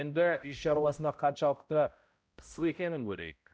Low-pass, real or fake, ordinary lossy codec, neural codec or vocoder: none; fake; none; codec, 16 kHz, 0.5 kbps, X-Codec, HuBERT features, trained on balanced general audio